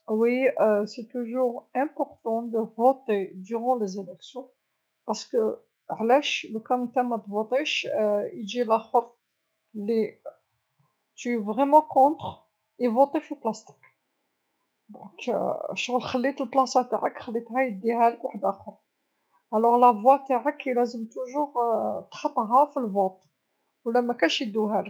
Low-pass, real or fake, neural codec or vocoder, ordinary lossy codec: none; fake; autoencoder, 48 kHz, 128 numbers a frame, DAC-VAE, trained on Japanese speech; none